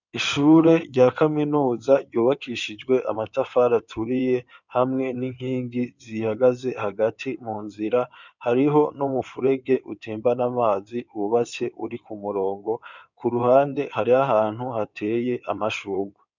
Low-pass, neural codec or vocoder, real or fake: 7.2 kHz; codec, 16 kHz in and 24 kHz out, 2.2 kbps, FireRedTTS-2 codec; fake